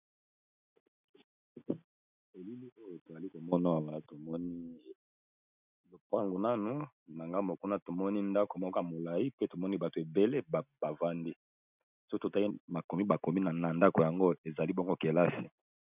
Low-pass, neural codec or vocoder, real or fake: 3.6 kHz; none; real